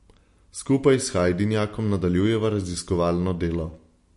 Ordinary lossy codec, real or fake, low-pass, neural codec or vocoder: MP3, 48 kbps; real; 14.4 kHz; none